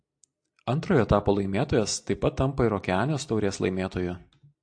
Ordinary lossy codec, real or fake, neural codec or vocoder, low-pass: AAC, 64 kbps; real; none; 9.9 kHz